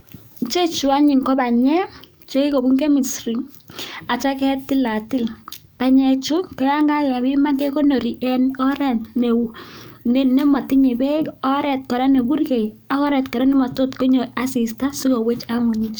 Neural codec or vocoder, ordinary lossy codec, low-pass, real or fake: codec, 44.1 kHz, 7.8 kbps, DAC; none; none; fake